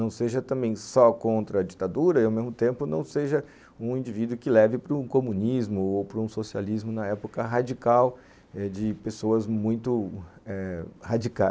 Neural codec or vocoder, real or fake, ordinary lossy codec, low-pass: none; real; none; none